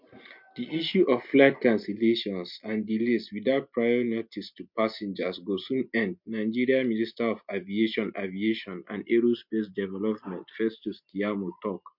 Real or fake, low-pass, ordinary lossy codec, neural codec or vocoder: real; 5.4 kHz; MP3, 48 kbps; none